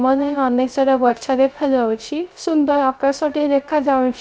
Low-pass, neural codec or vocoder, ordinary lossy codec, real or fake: none; codec, 16 kHz, 0.3 kbps, FocalCodec; none; fake